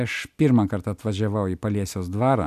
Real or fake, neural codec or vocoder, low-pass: real; none; 14.4 kHz